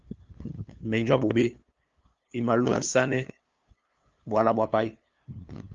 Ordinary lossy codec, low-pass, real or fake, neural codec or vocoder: Opus, 32 kbps; 7.2 kHz; fake; codec, 16 kHz, 2 kbps, FunCodec, trained on LibriTTS, 25 frames a second